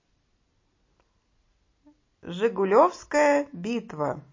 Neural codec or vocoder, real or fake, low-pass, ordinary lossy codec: none; real; 7.2 kHz; MP3, 32 kbps